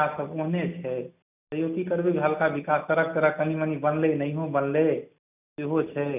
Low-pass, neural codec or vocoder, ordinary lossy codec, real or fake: 3.6 kHz; none; none; real